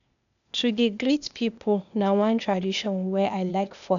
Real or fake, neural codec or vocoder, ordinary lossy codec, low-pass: fake; codec, 16 kHz, 0.8 kbps, ZipCodec; none; 7.2 kHz